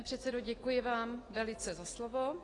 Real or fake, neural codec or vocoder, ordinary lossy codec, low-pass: real; none; AAC, 32 kbps; 10.8 kHz